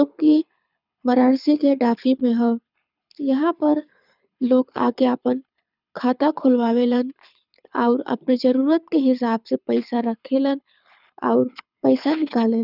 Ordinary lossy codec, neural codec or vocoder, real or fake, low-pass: none; codec, 24 kHz, 6 kbps, HILCodec; fake; 5.4 kHz